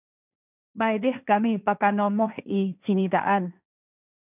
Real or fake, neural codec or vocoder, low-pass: fake; codec, 16 kHz, 1.1 kbps, Voila-Tokenizer; 3.6 kHz